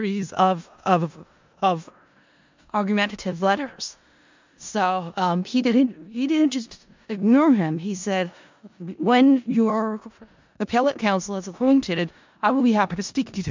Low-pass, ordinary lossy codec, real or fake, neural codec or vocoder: 7.2 kHz; MP3, 64 kbps; fake; codec, 16 kHz in and 24 kHz out, 0.4 kbps, LongCat-Audio-Codec, four codebook decoder